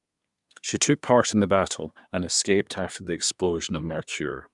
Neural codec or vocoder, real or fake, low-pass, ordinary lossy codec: codec, 24 kHz, 1 kbps, SNAC; fake; 10.8 kHz; none